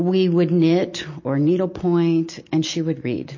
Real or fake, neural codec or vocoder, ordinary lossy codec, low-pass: real; none; MP3, 32 kbps; 7.2 kHz